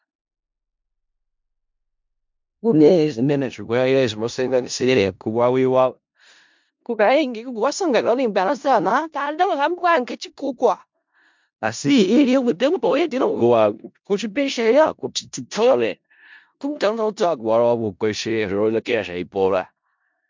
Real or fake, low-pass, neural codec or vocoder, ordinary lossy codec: fake; 7.2 kHz; codec, 16 kHz in and 24 kHz out, 0.4 kbps, LongCat-Audio-Codec, four codebook decoder; MP3, 64 kbps